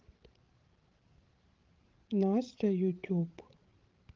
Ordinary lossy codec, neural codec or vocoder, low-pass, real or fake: Opus, 16 kbps; codec, 16 kHz, 16 kbps, FreqCodec, larger model; 7.2 kHz; fake